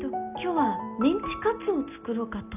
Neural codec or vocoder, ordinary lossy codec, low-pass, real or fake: none; none; 3.6 kHz; real